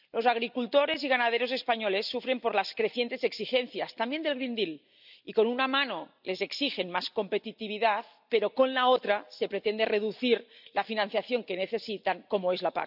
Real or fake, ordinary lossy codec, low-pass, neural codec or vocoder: real; none; 5.4 kHz; none